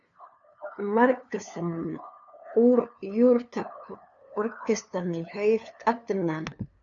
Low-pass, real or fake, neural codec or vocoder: 7.2 kHz; fake; codec, 16 kHz, 2 kbps, FunCodec, trained on LibriTTS, 25 frames a second